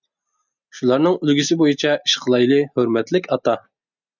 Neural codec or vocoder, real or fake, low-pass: none; real; 7.2 kHz